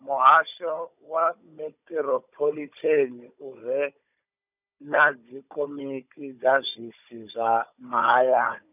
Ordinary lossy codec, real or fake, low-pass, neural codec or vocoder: none; fake; 3.6 kHz; codec, 16 kHz, 16 kbps, FunCodec, trained on Chinese and English, 50 frames a second